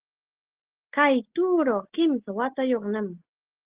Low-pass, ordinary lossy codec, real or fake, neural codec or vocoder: 3.6 kHz; Opus, 16 kbps; real; none